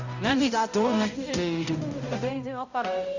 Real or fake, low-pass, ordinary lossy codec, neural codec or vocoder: fake; 7.2 kHz; Opus, 64 kbps; codec, 16 kHz, 0.5 kbps, X-Codec, HuBERT features, trained on balanced general audio